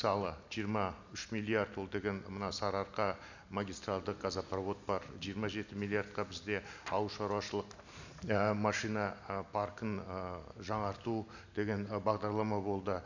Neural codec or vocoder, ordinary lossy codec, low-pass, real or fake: none; none; 7.2 kHz; real